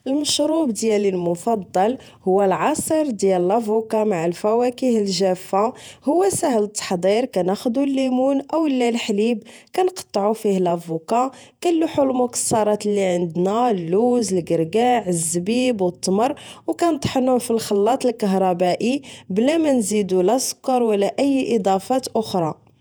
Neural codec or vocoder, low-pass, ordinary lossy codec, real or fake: vocoder, 48 kHz, 128 mel bands, Vocos; none; none; fake